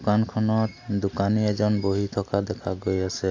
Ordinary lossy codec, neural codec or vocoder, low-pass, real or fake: AAC, 48 kbps; none; 7.2 kHz; real